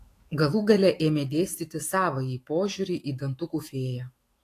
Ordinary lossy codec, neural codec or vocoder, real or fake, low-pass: AAC, 48 kbps; autoencoder, 48 kHz, 128 numbers a frame, DAC-VAE, trained on Japanese speech; fake; 14.4 kHz